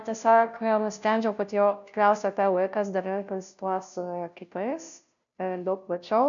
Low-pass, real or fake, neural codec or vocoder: 7.2 kHz; fake; codec, 16 kHz, 0.5 kbps, FunCodec, trained on Chinese and English, 25 frames a second